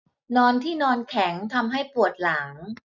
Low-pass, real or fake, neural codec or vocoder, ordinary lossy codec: 7.2 kHz; real; none; none